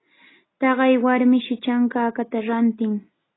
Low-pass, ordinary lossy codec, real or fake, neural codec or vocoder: 7.2 kHz; AAC, 16 kbps; real; none